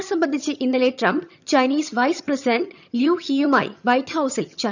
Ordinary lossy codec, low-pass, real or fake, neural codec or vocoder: none; 7.2 kHz; fake; vocoder, 22.05 kHz, 80 mel bands, HiFi-GAN